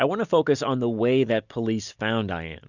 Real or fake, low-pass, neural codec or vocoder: real; 7.2 kHz; none